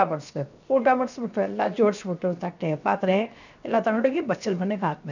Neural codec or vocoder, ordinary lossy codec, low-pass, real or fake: codec, 16 kHz, 0.7 kbps, FocalCodec; none; 7.2 kHz; fake